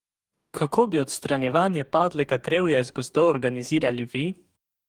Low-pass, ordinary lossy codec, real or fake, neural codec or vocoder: 19.8 kHz; Opus, 32 kbps; fake; codec, 44.1 kHz, 2.6 kbps, DAC